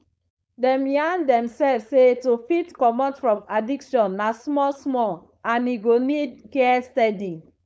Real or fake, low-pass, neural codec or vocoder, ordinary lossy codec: fake; none; codec, 16 kHz, 4.8 kbps, FACodec; none